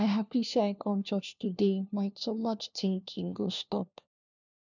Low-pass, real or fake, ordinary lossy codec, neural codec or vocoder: 7.2 kHz; fake; none; codec, 16 kHz, 1 kbps, FunCodec, trained on LibriTTS, 50 frames a second